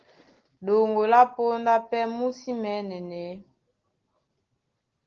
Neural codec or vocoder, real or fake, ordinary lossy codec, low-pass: none; real; Opus, 16 kbps; 7.2 kHz